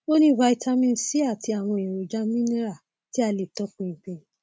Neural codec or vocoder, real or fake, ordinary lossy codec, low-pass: none; real; none; none